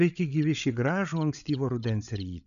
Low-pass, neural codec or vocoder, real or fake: 7.2 kHz; codec, 16 kHz, 8 kbps, FreqCodec, larger model; fake